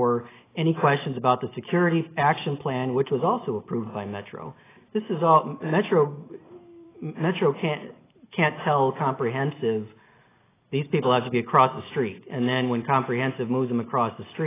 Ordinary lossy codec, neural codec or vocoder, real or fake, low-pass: AAC, 16 kbps; none; real; 3.6 kHz